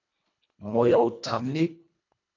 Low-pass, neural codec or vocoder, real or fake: 7.2 kHz; codec, 24 kHz, 1.5 kbps, HILCodec; fake